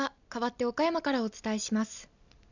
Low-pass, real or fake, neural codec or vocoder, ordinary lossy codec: 7.2 kHz; real; none; none